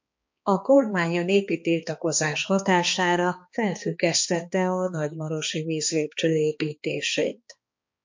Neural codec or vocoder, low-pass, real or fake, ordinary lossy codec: codec, 16 kHz, 2 kbps, X-Codec, HuBERT features, trained on balanced general audio; 7.2 kHz; fake; MP3, 48 kbps